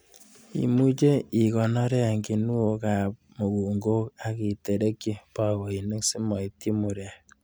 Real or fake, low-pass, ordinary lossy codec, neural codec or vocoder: real; none; none; none